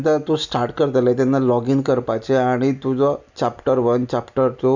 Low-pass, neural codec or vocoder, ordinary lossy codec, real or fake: 7.2 kHz; none; Opus, 64 kbps; real